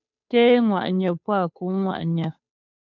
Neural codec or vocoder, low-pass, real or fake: codec, 16 kHz, 2 kbps, FunCodec, trained on Chinese and English, 25 frames a second; 7.2 kHz; fake